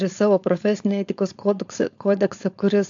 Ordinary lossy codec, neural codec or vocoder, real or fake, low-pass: AAC, 64 kbps; codec, 16 kHz, 4.8 kbps, FACodec; fake; 7.2 kHz